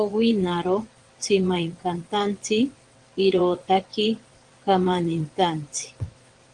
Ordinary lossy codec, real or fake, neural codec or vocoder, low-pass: Opus, 24 kbps; fake; vocoder, 22.05 kHz, 80 mel bands, WaveNeXt; 9.9 kHz